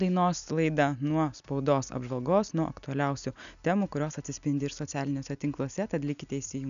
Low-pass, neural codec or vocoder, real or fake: 7.2 kHz; none; real